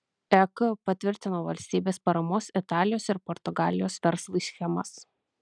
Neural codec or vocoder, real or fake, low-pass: none; real; 9.9 kHz